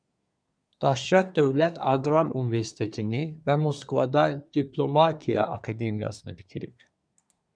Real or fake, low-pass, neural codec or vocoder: fake; 9.9 kHz; codec, 24 kHz, 1 kbps, SNAC